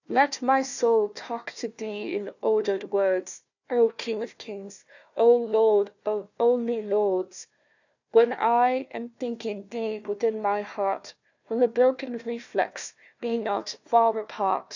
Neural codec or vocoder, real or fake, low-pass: codec, 16 kHz, 1 kbps, FunCodec, trained on Chinese and English, 50 frames a second; fake; 7.2 kHz